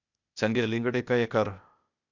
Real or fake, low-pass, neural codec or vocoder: fake; 7.2 kHz; codec, 16 kHz, 0.8 kbps, ZipCodec